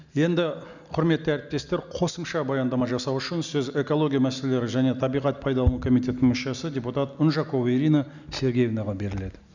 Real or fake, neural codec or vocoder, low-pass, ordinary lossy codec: real; none; 7.2 kHz; none